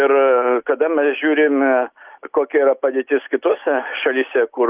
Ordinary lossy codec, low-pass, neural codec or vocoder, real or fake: Opus, 32 kbps; 3.6 kHz; none; real